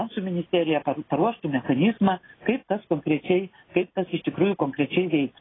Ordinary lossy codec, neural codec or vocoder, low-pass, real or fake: AAC, 16 kbps; none; 7.2 kHz; real